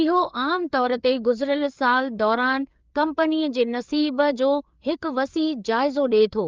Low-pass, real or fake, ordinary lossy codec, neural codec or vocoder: 7.2 kHz; fake; Opus, 32 kbps; codec, 16 kHz, 4 kbps, FreqCodec, larger model